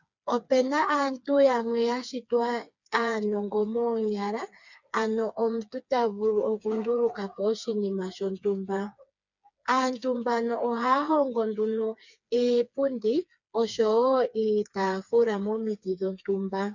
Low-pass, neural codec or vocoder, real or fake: 7.2 kHz; codec, 16 kHz, 4 kbps, FreqCodec, smaller model; fake